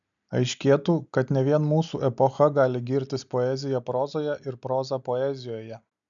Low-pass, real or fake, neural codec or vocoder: 7.2 kHz; real; none